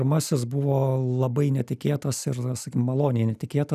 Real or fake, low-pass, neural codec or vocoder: real; 14.4 kHz; none